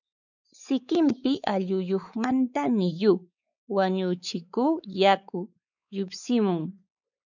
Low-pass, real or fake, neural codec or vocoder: 7.2 kHz; fake; codec, 16 kHz, 4 kbps, X-Codec, WavLM features, trained on Multilingual LibriSpeech